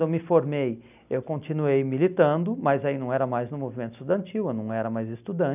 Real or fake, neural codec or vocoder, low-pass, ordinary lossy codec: real; none; 3.6 kHz; none